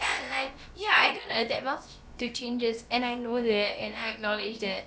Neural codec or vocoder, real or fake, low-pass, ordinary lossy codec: codec, 16 kHz, about 1 kbps, DyCAST, with the encoder's durations; fake; none; none